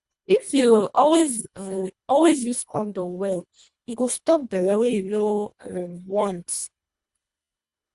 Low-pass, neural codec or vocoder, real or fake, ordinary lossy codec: 10.8 kHz; codec, 24 kHz, 1.5 kbps, HILCodec; fake; Opus, 64 kbps